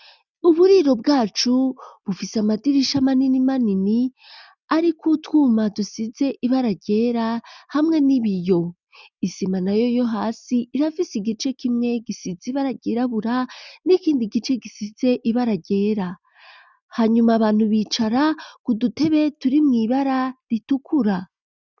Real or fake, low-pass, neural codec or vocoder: real; 7.2 kHz; none